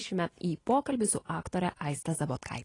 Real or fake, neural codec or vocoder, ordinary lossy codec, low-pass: real; none; AAC, 32 kbps; 10.8 kHz